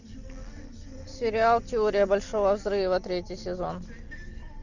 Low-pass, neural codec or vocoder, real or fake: 7.2 kHz; none; real